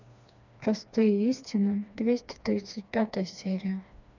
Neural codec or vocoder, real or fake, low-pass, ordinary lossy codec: codec, 16 kHz, 2 kbps, FreqCodec, smaller model; fake; 7.2 kHz; none